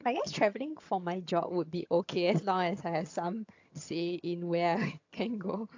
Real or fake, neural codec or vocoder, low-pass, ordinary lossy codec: fake; vocoder, 22.05 kHz, 80 mel bands, HiFi-GAN; 7.2 kHz; AAC, 48 kbps